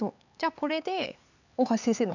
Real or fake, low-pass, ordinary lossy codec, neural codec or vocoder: fake; 7.2 kHz; none; codec, 16 kHz, 4 kbps, X-Codec, HuBERT features, trained on LibriSpeech